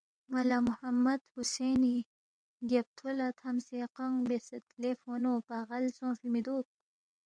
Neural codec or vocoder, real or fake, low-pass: vocoder, 22.05 kHz, 80 mel bands, WaveNeXt; fake; 9.9 kHz